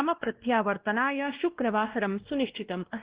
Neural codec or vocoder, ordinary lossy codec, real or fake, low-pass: codec, 16 kHz, 1 kbps, X-Codec, WavLM features, trained on Multilingual LibriSpeech; Opus, 16 kbps; fake; 3.6 kHz